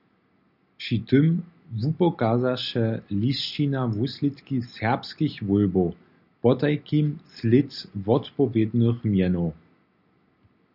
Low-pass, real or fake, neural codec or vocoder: 5.4 kHz; real; none